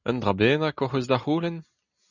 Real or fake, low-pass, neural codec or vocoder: real; 7.2 kHz; none